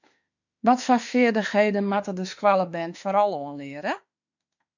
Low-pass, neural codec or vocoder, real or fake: 7.2 kHz; autoencoder, 48 kHz, 32 numbers a frame, DAC-VAE, trained on Japanese speech; fake